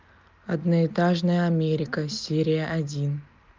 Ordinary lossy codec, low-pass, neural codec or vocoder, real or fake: Opus, 24 kbps; 7.2 kHz; none; real